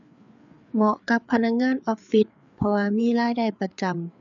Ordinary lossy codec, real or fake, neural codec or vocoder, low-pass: none; fake; codec, 16 kHz, 4 kbps, FreqCodec, larger model; 7.2 kHz